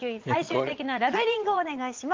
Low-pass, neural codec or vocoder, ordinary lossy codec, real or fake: 7.2 kHz; vocoder, 22.05 kHz, 80 mel bands, Vocos; Opus, 32 kbps; fake